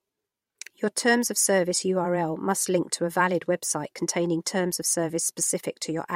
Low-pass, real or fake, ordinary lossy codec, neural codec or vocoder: 19.8 kHz; fake; MP3, 64 kbps; vocoder, 44.1 kHz, 128 mel bands every 512 samples, BigVGAN v2